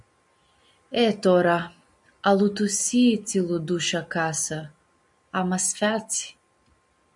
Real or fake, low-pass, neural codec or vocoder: real; 10.8 kHz; none